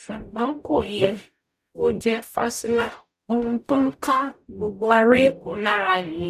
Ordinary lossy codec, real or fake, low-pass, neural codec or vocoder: none; fake; 14.4 kHz; codec, 44.1 kHz, 0.9 kbps, DAC